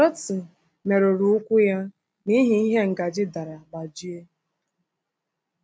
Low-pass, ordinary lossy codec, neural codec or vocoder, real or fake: none; none; none; real